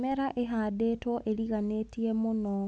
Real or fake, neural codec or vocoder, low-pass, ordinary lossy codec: real; none; none; none